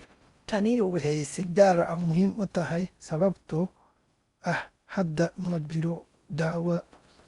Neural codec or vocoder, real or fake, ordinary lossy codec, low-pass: codec, 16 kHz in and 24 kHz out, 0.6 kbps, FocalCodec, streaming, 4096 codes; fake; Opus, 64 kbps; 10.8 kHz